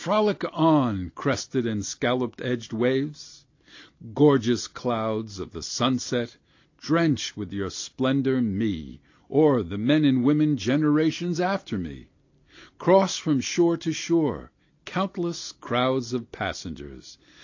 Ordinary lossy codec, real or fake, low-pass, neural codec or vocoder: AAC, 48 kbps; real; 7.2 kHz; none